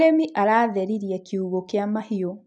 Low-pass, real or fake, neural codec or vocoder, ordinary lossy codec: 9.9 kHz; real; none; none